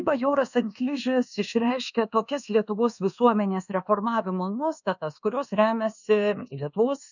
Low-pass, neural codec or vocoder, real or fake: 7.2 kHz; codec, 24 kHz, 1.2 kbps, DualCodec; fake